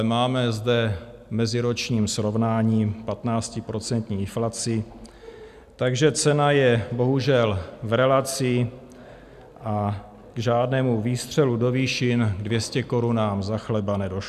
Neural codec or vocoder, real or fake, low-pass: none; real; 14.4 kHz